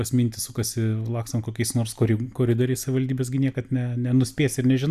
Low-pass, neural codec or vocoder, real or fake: 14.4 kHz; none; real